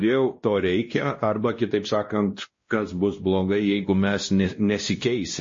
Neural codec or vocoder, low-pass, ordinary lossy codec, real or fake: codec, 16 kHz, 1 kbps, X-Codec, WavLM features, trained on Multilingual LibriSpeech; 7.2 kHz; MP3, 32 kbps; fake